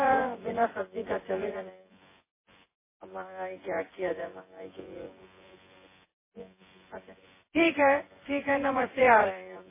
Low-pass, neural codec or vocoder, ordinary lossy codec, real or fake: 3.6 kHz; vocoder, 24 kHz, 100 mel bands, Vocos; MP3, 16 kbps; fake